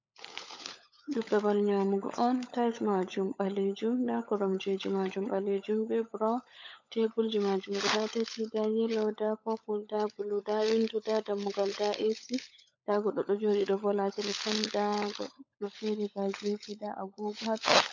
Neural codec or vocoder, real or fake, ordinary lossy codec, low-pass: codec, 16 kHz, 16 kbps, FunCodec, trained on LibriTTS, 50 frames a second; fake; MP3, 64 kbps; 7.2 kHz